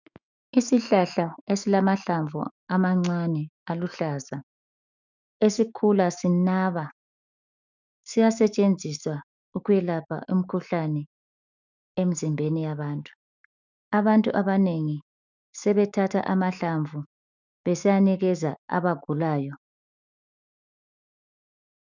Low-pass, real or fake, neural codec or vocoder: 7.2 kHz; fake; autoencoder, 48 kHz, 128 numbers a frame, DAC-VAE, trained on Japanese speech